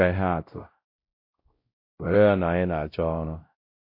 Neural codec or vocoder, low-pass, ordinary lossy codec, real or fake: codec, 16 kHz, 0.5 kbps, X-Codec, WavLM features, trained on Multilingual LibriSpeech; 5.4 kHz; MP3, 32 kbps; fake